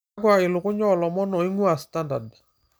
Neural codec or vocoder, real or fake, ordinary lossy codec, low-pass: none; real; none; none